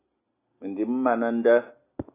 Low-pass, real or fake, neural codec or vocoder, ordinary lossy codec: 3.6 kHz; real; none; AAC, 24 kbps